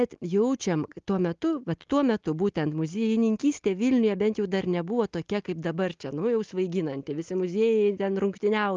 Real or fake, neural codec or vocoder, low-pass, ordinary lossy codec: real; none; 7.2 kHz; Opus, 32 kbps